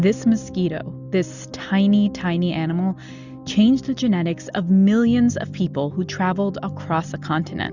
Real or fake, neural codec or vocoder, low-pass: real; none; 7.2 kHz